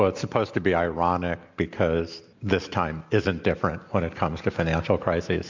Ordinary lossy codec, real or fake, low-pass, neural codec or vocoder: MP3, 64 kbps; real; 7.2 kHz; none